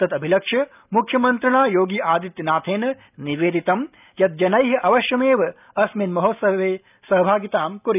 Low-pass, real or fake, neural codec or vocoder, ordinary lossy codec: 3.6 kHz; real; none; none